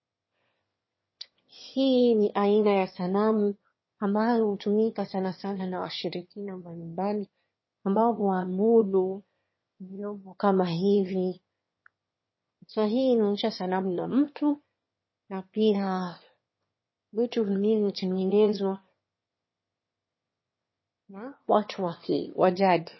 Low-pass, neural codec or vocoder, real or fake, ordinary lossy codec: 7.2 kHz; autoencoder, 22.05 kHz, a latent of 192 numbers a frame, VITS, trained on one speaker; fake; MP3, 24 kbps